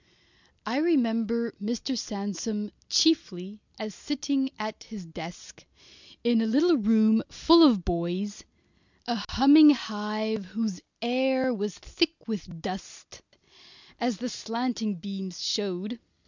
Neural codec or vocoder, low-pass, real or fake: none; 7.2 kHz; real